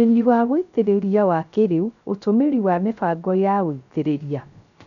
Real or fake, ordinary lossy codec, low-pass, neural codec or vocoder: fake; none; 7.2 kHz; codec, 16 kHz, 0.3 kbps, FocalCodec